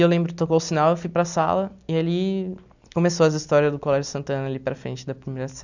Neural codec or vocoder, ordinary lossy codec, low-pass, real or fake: none; none; 7.2 kHz; real